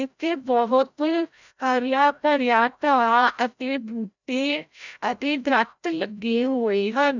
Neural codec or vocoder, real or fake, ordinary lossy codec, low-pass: codec, 16 kHz, 0.5 kbps, FreqCodec, larger model; fake; none; 7.2 kHz